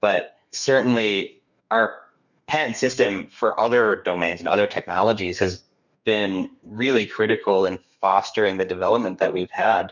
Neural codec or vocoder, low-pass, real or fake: codec, 32 kHz, 1.9 kbps, SNAC; 7.2 kHz; fake